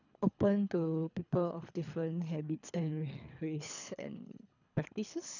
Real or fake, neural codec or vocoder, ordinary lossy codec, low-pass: fake; codec, 24 kHz, 3 kbps, HILCodec; none; 7.2 kHz